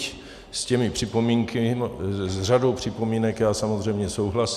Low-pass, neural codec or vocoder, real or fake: 14.4 kHz; none; real